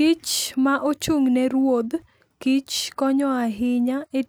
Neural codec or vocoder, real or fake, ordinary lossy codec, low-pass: none; real; none; none